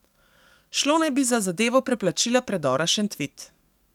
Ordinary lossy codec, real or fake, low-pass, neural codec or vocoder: none; fake; 19.8 kHz; codec, 44.1 kHz, 7.8 kbps, DAC